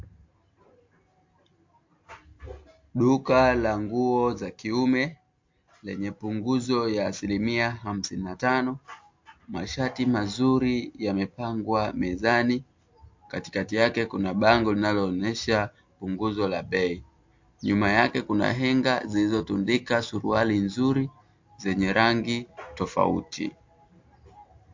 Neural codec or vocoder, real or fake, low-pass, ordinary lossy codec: none; real; 7.2 kHz; MP3, 48 kbps